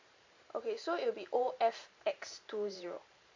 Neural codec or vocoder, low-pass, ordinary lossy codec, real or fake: vocoder, 44.1 kHz, 128 mel bands every 512 samples, BigVGAN v2; 7.2 kHz; MP3, 48 kbps; fake